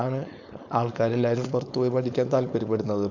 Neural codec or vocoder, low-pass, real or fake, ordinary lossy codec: codec, 16 kHz, 4.8 kbps, FACodec; 7.2 kHz; fake; none